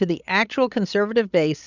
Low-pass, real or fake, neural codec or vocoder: 7.2 kHz; fake; codec, 16 kHz, 16 kbps, FreqCodec, larger model